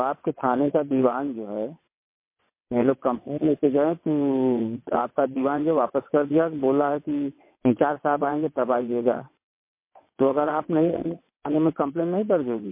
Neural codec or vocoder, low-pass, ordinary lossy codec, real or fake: vocoder, 22.05 kHz, 80 mel bands, WaveNeXt; 3.6 kHz; MP3, 24 kbps; fake